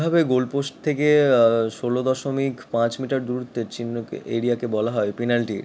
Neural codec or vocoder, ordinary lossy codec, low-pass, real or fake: none; none; none; real